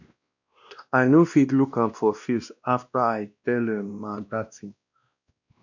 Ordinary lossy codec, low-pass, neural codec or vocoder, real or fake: none; 7.2 kHz; codec, 16 kHz, 1 kbps, X-Codec, WavLM features, trained on Multilingual LibriSpeech; fake